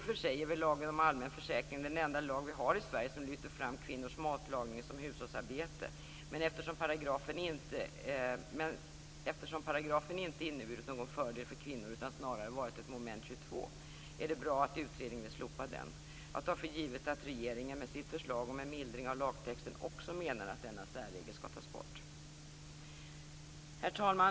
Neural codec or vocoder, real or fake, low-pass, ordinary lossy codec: none; real; none; none